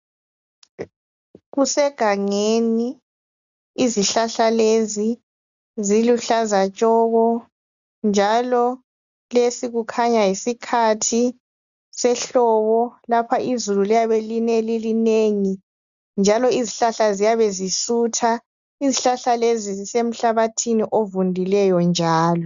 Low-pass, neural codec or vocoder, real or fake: 7.2 kHz; none; real